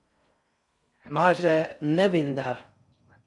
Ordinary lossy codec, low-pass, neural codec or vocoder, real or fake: MP3, 96 kbps; 10.8 kHz; codec, 16 kHz in and 24 kHz out, 0.6 kbps, FocalCodec, streaming, 4096 codes; fake